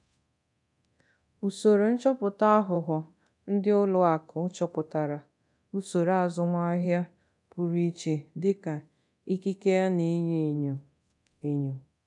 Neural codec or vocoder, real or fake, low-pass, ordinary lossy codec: codec, 24 kHz, 0.9 kbps, DualCodec; fake; 10.8 kHz; none